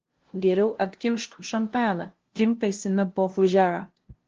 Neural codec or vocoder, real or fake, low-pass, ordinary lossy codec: codec, 16 kHz, 0.5 kbps, FunCodec, trained on LibriTTS, 25 frames a second; fake; 7.2 kHz; Opus, 16 kbps